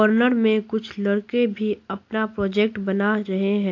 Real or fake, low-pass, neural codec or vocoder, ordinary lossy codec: real; 7.2 kHz; none; AAC, 48 kbps